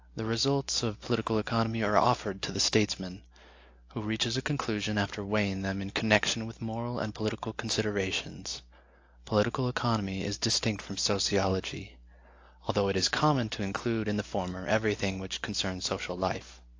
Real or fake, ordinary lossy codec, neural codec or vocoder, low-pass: real; AAC, 48 kbps; none; 7.2 kHz